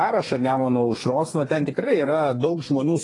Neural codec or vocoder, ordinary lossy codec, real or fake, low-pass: codec, 32 kHz, 1.9 kbps, SNAC; AAC, 32 kbps; fake; 10.8 kHz